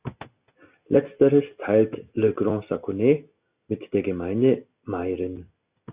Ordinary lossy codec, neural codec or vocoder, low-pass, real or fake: Opus, 64 kbps; none; 3.6 kHz; real